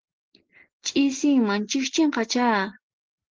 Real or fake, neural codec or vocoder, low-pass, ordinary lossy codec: real; none; 7.2 kHz; Opus, 16 kbps